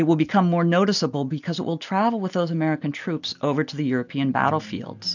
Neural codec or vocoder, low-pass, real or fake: none; 7.2 kHz; real